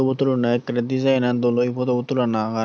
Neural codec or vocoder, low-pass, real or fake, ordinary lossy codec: none; none; real; none